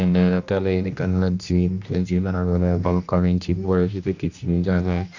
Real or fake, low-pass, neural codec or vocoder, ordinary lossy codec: fake; 7.2 kHz; codec, 16 kHz, 1 kbps, X-Codec, HuBERT features, trained on general audio; none